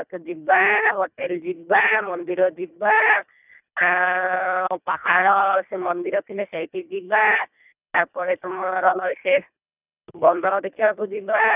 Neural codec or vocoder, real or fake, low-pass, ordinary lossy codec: codec, 24 kHz, 1.5 kbps, HILCodec; fake; 3.6 kHz; none